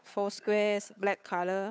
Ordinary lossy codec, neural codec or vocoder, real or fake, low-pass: none; codec, 16 kHz, 8 kbps, FunCodec, trained on Chinese and English, 25 frames a second; fake; none